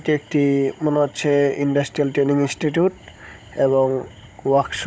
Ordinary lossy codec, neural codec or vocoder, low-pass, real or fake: none; codec, 16 kHz, 16 kbps, FunCodec, trained on Chinese and English, 50 frames a second; none; fake